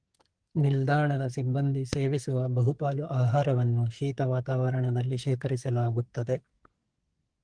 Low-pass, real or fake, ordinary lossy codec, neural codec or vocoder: 9.9 kHz; fake; Opus, 24 kbps; codec, 44.1 kHz, 2.6 kbps, SNAC